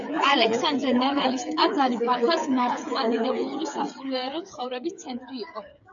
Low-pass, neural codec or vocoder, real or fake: 7.2 kHz; codec, 16 kHz, 8 kbps, FreqCodec, smaller model; fake